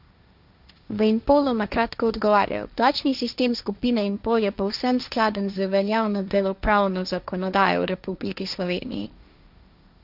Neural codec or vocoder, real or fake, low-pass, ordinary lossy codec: codec, 16 kHz, 1.1 kbps, Voila-Tokenizer; fake; 5.4 kHz; none